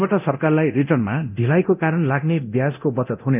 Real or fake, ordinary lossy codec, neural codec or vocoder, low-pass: fake; none; codec, 24 kHz, 0.9 kbps, DualCodec; 3.6 kHz